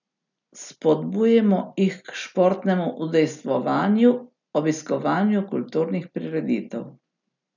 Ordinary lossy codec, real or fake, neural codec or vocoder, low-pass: none; real; none; 7.2 kHz